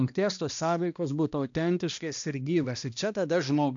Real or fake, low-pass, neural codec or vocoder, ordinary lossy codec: fake; 7.2 kHz; codec, 16 kHz, 1 kbps, X-Codec, HuBERT features, trained on balanced general audio; AAC, 64 kbps